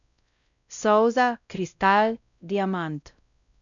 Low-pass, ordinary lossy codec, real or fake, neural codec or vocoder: 7.2 kHz; none; fake; codec, 16 kHz, 0.5 kbps, X-Codec, WavLM features, trained on Multilingual LibriSpeech